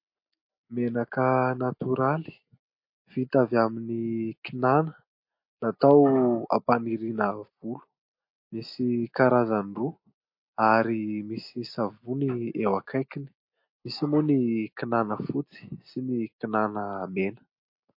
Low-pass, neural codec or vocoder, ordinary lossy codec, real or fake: 5.4 kHz; none; MP3, 32 kbps; real